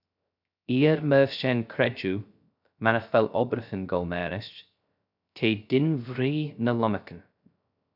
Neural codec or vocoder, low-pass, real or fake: codec, 16 kHz, 0.3 kbps, FocalCodec; 5.4 kHz; fake